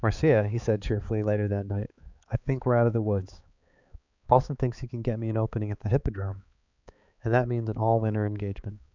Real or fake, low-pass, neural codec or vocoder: fake; 7.2 kHz; codec, 16 kHz, 4 kbps, X-Codec, HuBERT features, trained on balanced general audio